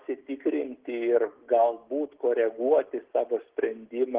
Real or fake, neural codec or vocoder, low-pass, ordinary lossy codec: real; none; 3.6 kHz; Opus, 16 kbps